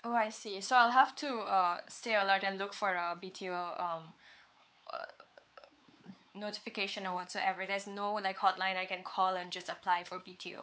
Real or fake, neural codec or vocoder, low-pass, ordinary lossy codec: fake; codec, 16 kHz, 4 kbps, X-Codec, WavLM features, trained on Multilingual LibriSpeech; none; none